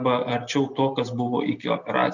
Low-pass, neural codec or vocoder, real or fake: 7.2 kHz; none; real